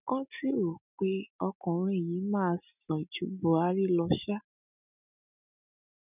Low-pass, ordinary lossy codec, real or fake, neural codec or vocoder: 3.6 kHz; none; real; none